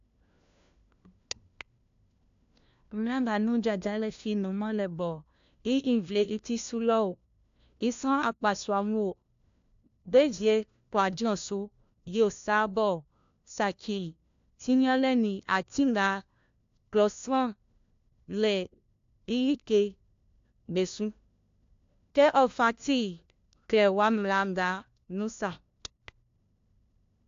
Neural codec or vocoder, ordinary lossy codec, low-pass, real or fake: codec, 16 kHz, 1 kbps, FunCodec, trained on LibriTTS, 50 frames a second; AAC, 64 kbps; 7.2 kHz; fake